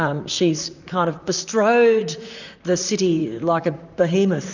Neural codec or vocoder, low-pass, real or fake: vocoder, 44.1 kHz, 128 mel bands, Pupu-Vocoder; 7.2 kHz; fake